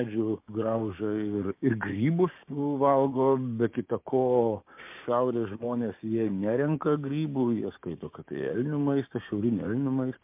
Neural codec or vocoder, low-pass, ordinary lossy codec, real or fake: codec, 16 kHz, 6 kbps, DAC; 3.6 kHz; MP3, 32 kbps; fake